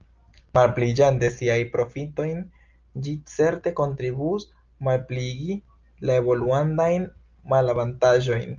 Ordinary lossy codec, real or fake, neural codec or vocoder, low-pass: Opus, 24 kbps; real; none; 7.2 kHz